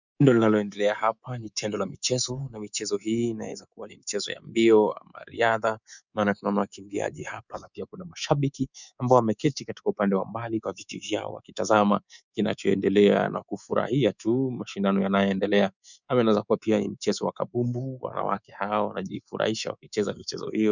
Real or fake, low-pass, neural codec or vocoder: fake; 7.2 kHz; codec, 24 kHz, 3.1 kbps, DualCodec